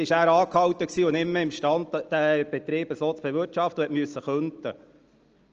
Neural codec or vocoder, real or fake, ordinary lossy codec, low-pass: none; real; Opus, 24 kbps; 7.2 kHz